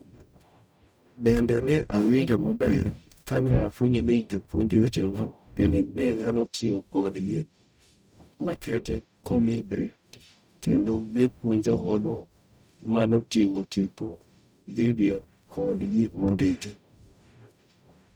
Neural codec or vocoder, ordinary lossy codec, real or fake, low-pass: codec, 44.1 kHz, 0.9 kbps, DAC; none; fake; none